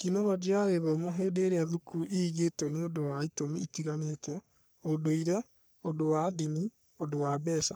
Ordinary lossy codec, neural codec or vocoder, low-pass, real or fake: none; codec, 44.1 kHz, 3.4 kbps, Pupu-Codec; none; fake